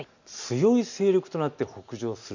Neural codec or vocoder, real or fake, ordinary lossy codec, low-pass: none; real; none; 7.2 kHz